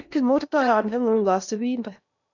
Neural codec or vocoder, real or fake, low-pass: codec, 16 kHz in and 24 kHz out, 0.8 kbps, FocalCodec, streaming, 65536 codes; fake; 7.2 kHz